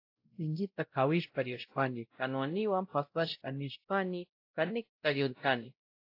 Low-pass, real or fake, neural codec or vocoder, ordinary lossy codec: 5.4 kHz; fake; codec, 16 kHz, 0.5 kbps, X-Codec, WavLM features, trained on Multilingual LibriSpeech; AAC, 32 kbps